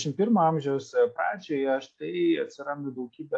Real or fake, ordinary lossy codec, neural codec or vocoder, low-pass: real; AAC, 48 kbps; none; 9.9 kHz